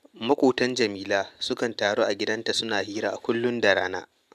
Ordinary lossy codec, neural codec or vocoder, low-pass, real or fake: none; none; 14.4 kHz; real